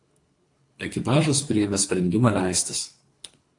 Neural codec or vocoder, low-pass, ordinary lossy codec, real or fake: codec, 24 kHz, 3 kbps, HILCodec; 10.8 kHz; AAC, 48 kbps; fake